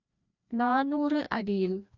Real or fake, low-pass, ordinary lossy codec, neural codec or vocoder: fake; 7.2 kHz; none; codec, 16 kHz, 1 kbps, FreqCodec, larger model